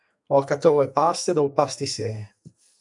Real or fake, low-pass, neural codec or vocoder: fake; 10.8 kHz; codec, 44.1 kHz, 2.6 kbps, SNAC